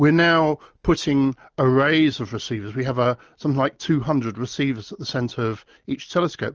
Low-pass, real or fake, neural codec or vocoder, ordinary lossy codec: 7.2 kHz; real; none; Opus, 24 kbps